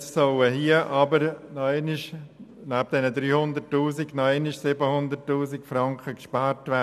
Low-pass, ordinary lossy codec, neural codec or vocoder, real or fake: 14.4 kHz; none; none; real